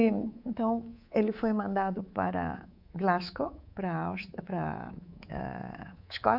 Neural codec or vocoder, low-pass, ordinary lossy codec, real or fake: codec, 24 kHz, 3.1 kbps, DualCodec; 5.4 kHz; AAC, 48 kbps; fake